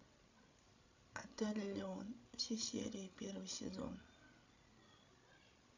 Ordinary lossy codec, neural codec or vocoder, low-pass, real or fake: none; codec, 16 kHz, 16 kbps, FreqCodec, larger model; 7.2 kHz; fake